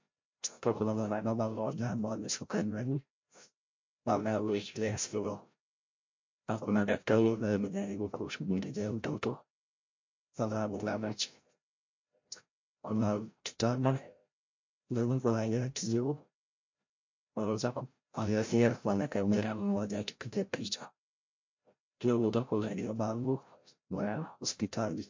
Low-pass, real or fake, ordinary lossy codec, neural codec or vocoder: 7.2 kHz; fake; MP3, 48 kbps; codec, 16 kHz, 0.5 kbps, FreqCodec, larger model